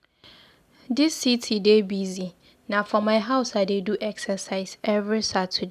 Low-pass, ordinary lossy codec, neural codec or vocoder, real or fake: 14.4 kHz; none; none; real